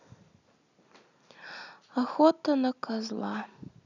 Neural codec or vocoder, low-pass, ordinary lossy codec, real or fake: none; 7.2 kHz; none; real